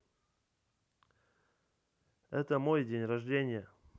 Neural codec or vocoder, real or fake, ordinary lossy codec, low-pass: none; real; none; none